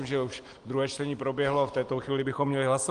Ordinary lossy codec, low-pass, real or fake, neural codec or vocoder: Opus, 24 kbps; 9.9 kHz; real; none